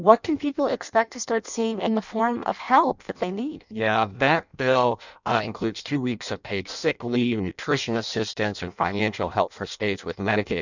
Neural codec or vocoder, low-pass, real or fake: codec, 16 kHz in and 24 kHz out, 0.6 kbps, FireRedTTS-2 codec; 7.2 kHz; fake